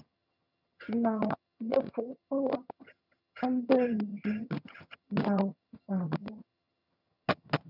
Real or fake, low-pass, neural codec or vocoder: fake; 5.4 kHz; vocoder, 22.05 kHz, 80 mel bands, HiFi-GAN